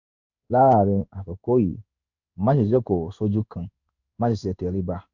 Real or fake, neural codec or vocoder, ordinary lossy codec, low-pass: fake; codec, 16 kHz in and 24 kHz out, 1 kbps, XY-Tokenizer; none; 7.2 kHz